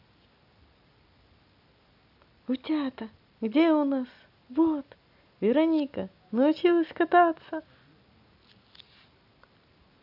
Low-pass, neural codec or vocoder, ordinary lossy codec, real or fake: 5.4 kHz; none; none; real